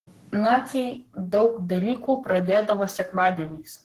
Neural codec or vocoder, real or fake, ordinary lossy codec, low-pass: codec, 44.1 kHz, 3.4 kbps, Pupu-Codec; fake; Opus, 16 kbps; 14.4 kHz